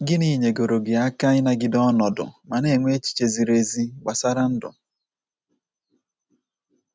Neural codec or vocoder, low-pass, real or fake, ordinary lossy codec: none; none; real; none